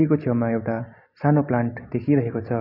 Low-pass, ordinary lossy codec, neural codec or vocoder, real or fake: 5.4 kHz; none; none; real